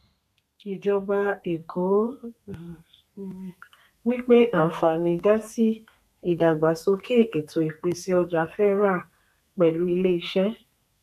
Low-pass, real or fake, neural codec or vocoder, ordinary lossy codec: 14.4 kHz; fake; codec, 32 kHz, 1.9 kbps, SNAC; none